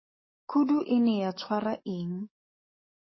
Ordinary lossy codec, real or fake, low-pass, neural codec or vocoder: MP3, 24 kbps; fake; 7.2 kHz; codec, 44.1 kHz, 7.8 kbps, DAC